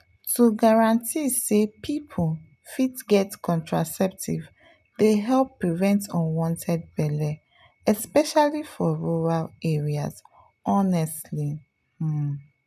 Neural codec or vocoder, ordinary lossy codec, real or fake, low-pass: none; none; real; 14.4 kHz